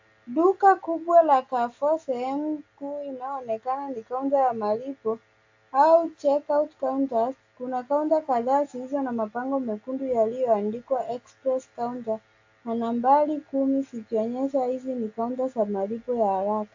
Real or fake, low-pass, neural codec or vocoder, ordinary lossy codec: real; 7.2 kHz; none; AAC, 48 kbps